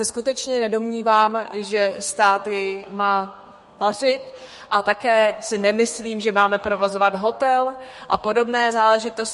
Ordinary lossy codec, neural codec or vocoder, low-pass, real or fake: MP3, 48 kbps; codec, 32 kHz, 1.9 kbps, SNAC; 14.4 kHz; fake